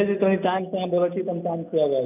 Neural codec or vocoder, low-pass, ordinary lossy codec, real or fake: none; 3.6 kHz; none; real